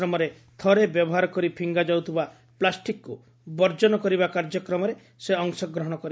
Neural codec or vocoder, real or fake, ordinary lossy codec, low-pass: none; real; none; none